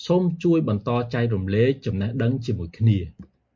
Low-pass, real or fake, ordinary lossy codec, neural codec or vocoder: 7.2 kHz; real; MP3, 48 kbps; none